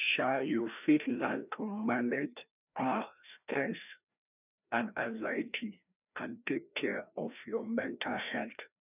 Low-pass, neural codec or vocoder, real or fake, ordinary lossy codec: 3.6 kHz; codec, 16 kHz, 1 kbps, FreqCodec, larger model; fake; none